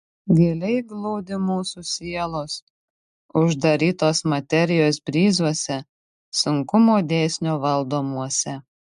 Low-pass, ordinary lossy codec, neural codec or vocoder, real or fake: 10.8 kHz; MP3, 64 kbps; none; real